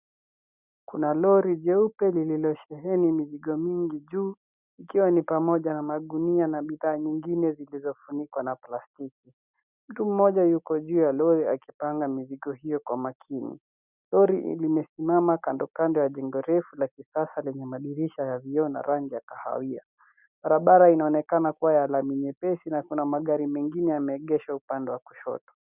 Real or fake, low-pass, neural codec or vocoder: real; 3.6 kHz; none